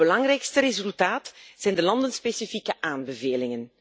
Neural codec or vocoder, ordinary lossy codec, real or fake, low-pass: none; none; real; none